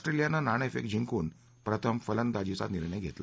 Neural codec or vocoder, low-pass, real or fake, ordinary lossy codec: none; none; real; none